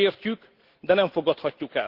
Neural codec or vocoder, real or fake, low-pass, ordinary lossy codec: none; real; 5.4 kHz; Opus, 16 kbps